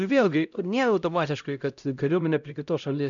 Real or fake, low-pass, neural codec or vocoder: fake; 7.2 kHz; codec, 16 kHz, 0.5 kbps, X-Codec, HuBERT features, trained on LibriSpeech